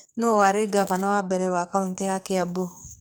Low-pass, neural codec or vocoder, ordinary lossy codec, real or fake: none; codec, 44.1 kHz, 2.6 kbps, SNAC; none; fake